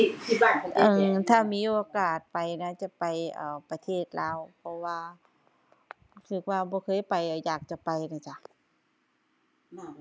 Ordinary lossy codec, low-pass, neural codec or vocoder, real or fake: none; none; none; real